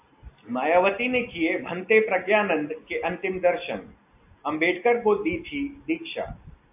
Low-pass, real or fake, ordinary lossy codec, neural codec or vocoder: 3.6 kHz; real; AAC, 32 kbps; none